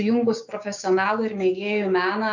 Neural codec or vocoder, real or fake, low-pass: codec, 16 kHz, 6 kbps, DAC; fake; 7.2 kHz